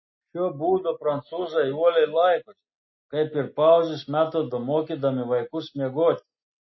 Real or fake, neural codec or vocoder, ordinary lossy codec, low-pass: real; none; MP3, 24 kbps; 7.2 kHz